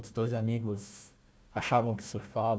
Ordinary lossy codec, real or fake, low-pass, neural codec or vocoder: none; fake; none; codec, 16 kHz, 1 kbps, FunCodec, trained on Chinese and English, 50 frames a second